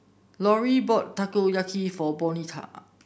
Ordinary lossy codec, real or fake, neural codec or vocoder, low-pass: none; real; none; none